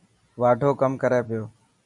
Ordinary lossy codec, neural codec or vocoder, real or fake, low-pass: MP3, 96 kbps; none; real; 10.8 kHz